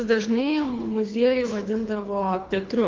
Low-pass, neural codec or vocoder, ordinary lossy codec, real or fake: 7.2 kHz; codec, 24 kHz, 3 kbps, HILCodec; Opus, 32 kbps; fake